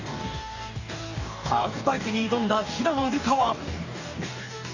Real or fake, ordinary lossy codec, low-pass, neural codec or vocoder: fake; none; 7.2 kHz; codec, 44.1 kHz, 2.6 kbps, DAC